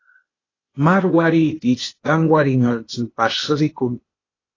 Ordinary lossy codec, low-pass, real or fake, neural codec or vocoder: AAC, 32 kbps; 7.2 kHz; fake; codec, 16 kHz, 0.8 kbps, ZipCodec